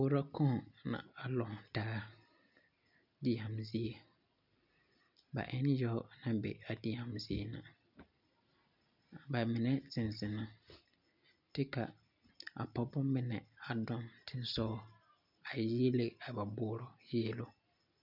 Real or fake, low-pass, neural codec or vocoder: real; 5.4 kHz; none